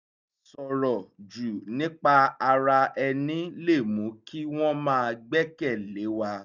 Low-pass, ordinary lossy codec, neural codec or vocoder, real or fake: 7.2 kHz; none; none; real